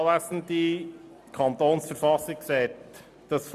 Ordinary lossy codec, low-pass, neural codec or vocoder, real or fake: none; 14.4 kHz; none; real